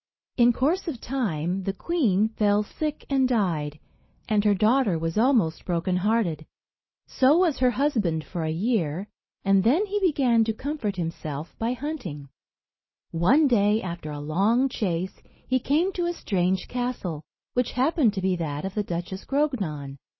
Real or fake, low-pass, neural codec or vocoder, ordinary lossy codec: real; 7.2 kHz; none; MP3, 24 kbps